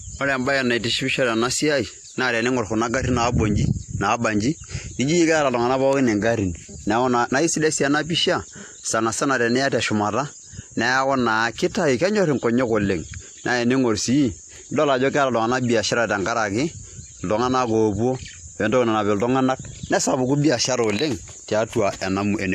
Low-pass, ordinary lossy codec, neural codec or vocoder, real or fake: 14.4 kHz; AAC, 64 kbps; none; real